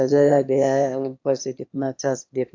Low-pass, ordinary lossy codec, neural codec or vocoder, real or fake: 7.2 kHz; AAC, 48 kbps; autoencoder, 22.05 kHz, a latent of 192 numbers a frame, VITS, trained on one speaker; fake